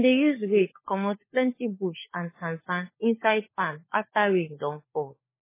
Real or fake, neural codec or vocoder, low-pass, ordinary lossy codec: fake; codec, 16 kHz, 4 kbps, FunCodec, trained on LibriTTS, 50 frames a second; 3.6 kHz; MP3, 16 kbps